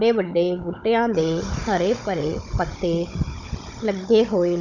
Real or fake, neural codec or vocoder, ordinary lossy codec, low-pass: fake; codec, 16 kHz, 16 kbps, FunCodec, trained on Chinese and English, 50 frames a second; none; 7.2 kHz